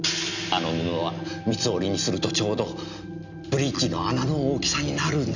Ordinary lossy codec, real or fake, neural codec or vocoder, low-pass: none; real; none; 7.2 kHz